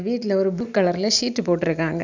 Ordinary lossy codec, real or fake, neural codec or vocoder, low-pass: none; real; none; 7.2 kHz